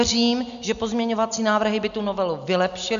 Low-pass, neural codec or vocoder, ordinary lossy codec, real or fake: 7.2 kHz; none; AAC, 96 kbps; real